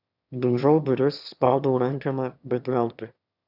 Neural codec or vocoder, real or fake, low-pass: autoencoder, 22.05 kHz, a latent of 192 numbers a frame, VITS, trained on one speaker; fake; 5.4 kHz